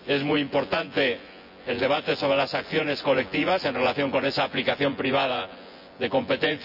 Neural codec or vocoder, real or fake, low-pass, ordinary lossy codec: vocoder, 24 kHz, 100 mel bands, Vocos; fake; 5.4 kHz; none